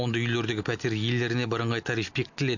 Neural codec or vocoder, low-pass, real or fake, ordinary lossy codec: none; 7.2 kHz; real; none